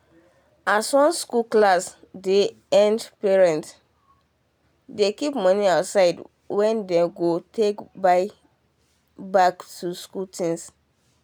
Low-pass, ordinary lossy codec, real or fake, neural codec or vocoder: none; none; real; none